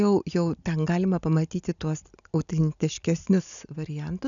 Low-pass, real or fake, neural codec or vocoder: 7.2 kHz; real; none